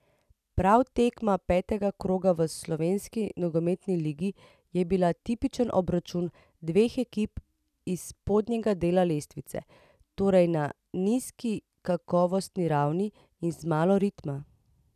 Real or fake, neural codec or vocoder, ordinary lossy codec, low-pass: real; none; none; 14.4 kHz